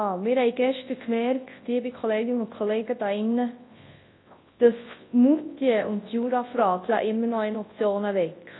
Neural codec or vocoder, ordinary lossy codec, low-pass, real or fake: codec, 24 kHz, 0.9 kbps, WavTokenizer, large speech release; AAC, 16 kbps; 7.2 kHz; fake